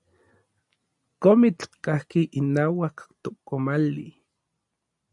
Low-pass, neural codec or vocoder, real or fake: 10.8 kHz; none; real